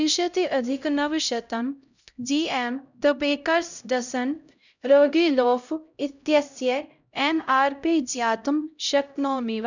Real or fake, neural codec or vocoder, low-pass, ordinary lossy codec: fake; codec, 16 kHz, 0.5 kbps, X-Codec, HuBERT features, trained on LibriSpeech; 7.2 kHz; none